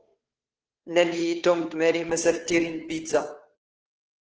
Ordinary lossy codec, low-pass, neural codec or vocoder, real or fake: Opus, 16 kbps; 7.2 kHz; codec, 16 kHz, 2 kbps, FunCodec, trained on Chinese and English, 25 frames a second; fake